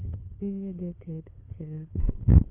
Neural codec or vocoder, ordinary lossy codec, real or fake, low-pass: codec, 16 kHz, 8 kbps, FunCodec, trained on LibriTTS, 25 frames a second; none; fake; 3.6 kHz